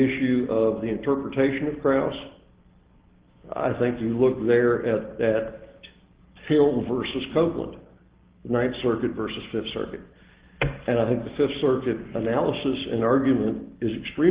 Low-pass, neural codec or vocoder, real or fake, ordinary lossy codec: 3.6 kHz; none; real; Opus, 16 kbps